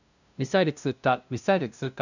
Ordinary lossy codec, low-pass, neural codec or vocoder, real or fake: none; 7.2 kHz; codec, 16 kHz, 0.5 kbps, FunCodec, trained on LibriTTS, 25 frames a second; fake